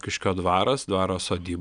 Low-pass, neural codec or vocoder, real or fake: 9.9 kHz; none; real